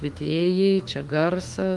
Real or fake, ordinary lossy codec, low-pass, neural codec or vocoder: fake; Opus, 24 kbps; 10.8 kHz; autoencoder, 48 kHz, 32 numbers a frame, DAC-VAE, trained on Japanese speech